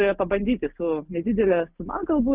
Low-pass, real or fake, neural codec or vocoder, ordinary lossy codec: 3.6 kHz; real; none; Opus, 32 kbps